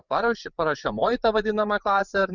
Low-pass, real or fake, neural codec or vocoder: 7.2 kHz; fake; vocoder, 24 kHz, 100 mel bands, Vocos